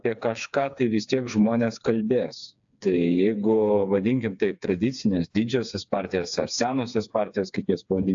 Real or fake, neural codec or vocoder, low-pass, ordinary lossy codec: fake; codec, 16 kHz, 4 kbps, FreqCodec, smaller model; 7.2 kHz; AAC, 64 kbps